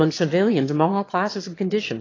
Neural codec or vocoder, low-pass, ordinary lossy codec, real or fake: autoencoder, 22.05 kHz, a latent of 192 numbers a frame, VITS, trained on one speaker; 7.2 kHz; AAC, 32 kbps; fake